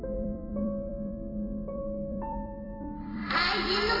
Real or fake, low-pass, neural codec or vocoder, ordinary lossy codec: fake; 5.4 kHz; vocoder, 44.1 kHz, 128 mel bands every 256 samples, BigVGAN v2; none